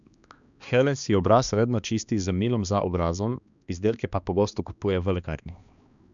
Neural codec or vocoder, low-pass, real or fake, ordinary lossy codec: codec, 16 kHz, 2 kbps, X-Codec, HuBERT features, trained on balanced general audio; 7.2 kHz; fake; none